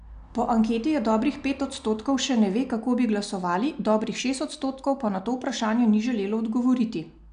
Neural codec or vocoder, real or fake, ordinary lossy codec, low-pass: none; real; none; 9.9 kHz